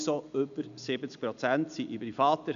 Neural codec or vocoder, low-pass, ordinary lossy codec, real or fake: none; 7.2 kHz; none; real